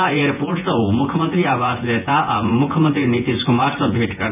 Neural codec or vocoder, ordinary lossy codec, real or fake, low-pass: vocoder, 24 kHz, 100 mel bands, Vocos; none; fake; 3.6 kHz